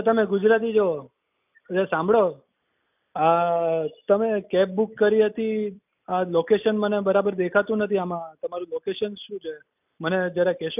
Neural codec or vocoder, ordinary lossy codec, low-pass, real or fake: none; none; 3.6 kHz; real